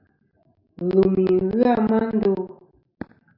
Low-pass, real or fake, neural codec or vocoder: 5.4 kHz; real; none